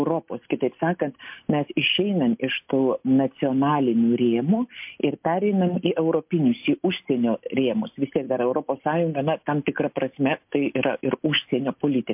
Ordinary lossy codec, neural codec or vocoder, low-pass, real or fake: MP3, 32 kbps; none; 3.6 kHz; real